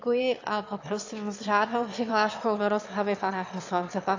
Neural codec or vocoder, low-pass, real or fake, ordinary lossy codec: autoencoder, 22.05 kHz, a latent of 192 numbers a frame, VITS, trained on one speaker; 7.2 kHz; fake; AAC, 48 kbps